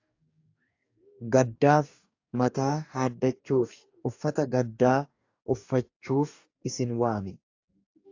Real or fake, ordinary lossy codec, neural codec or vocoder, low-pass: fake; AAC, 48 kbps; codec, 44.1 kHz, 2.6 kbps, DAC; 7.2 kHz